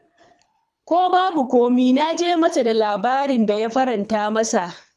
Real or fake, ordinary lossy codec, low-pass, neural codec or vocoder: fake; none; none; codec, 24 kHz, 3 kbps, HILCodec